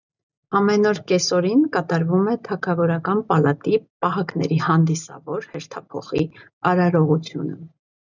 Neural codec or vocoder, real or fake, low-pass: none; real; 7.2 kHz